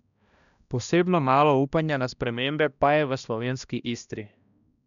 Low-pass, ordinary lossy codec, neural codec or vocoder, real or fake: 7.2 kHz; none; codec, 16 kHz, 1 kbps, X-Codec, HuBERT features, trained on balanced general audio; fake